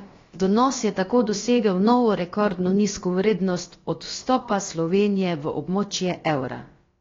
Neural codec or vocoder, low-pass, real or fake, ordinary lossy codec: codec, 16 kHz, about 1 kbps, DyCAST, with the encoder's durations; 7.2 kHz; fake; AAC, 32 kbps